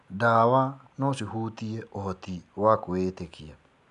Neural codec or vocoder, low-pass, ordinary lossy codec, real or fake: none; 10.8 kHz; none; real